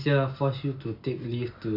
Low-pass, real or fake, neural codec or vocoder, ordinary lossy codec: 5.4 kHz; real; none; none